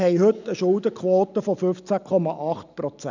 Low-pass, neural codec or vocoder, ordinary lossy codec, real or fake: 7.2 kHz; none; none; real